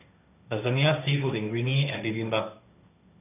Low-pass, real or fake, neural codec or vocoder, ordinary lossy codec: 3.6 kHz; fake; codec, 16 kHz, 1.1 kbps, Voila-Tokenizer; none